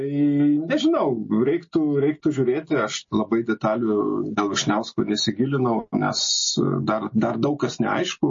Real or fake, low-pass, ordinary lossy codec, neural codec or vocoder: real; 7.2 kHz; MP3, 32 kbps; none